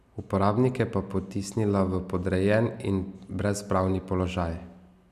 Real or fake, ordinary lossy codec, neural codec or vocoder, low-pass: real; none; none; 14.4 kHz